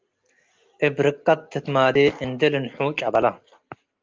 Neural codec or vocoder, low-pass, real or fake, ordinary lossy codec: none; 7.2 kHz; real; Opus, 24 kbps